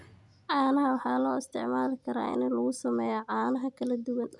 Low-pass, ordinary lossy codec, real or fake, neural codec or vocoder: 10.8 kHz; none; real; none